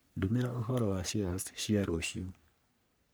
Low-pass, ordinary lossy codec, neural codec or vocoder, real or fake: none; none; codec, 44.1 kHz, 3.4 kbps, Pupu-Codec; fake